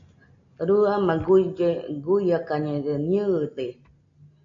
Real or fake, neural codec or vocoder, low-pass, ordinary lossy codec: real; none; 7.2 kHz; MP3, 48 kbps